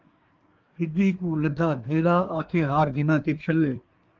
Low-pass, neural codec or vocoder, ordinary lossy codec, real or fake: 7.2 kHz; codec, 24 kHz, 1 kbps, SNAC; Opus, 24 kbps; fake